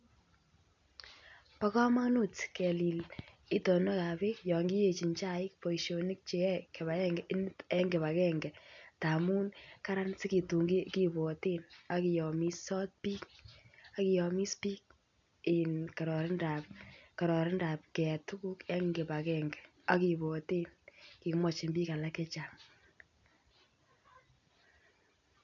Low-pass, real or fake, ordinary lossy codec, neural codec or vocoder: 7.2 kHz; real; none; none